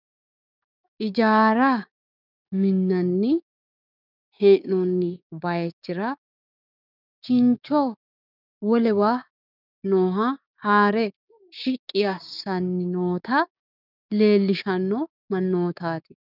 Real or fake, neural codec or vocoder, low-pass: fake; codec, 16 kHz, 6 kbps, DAC; 5.4 kHz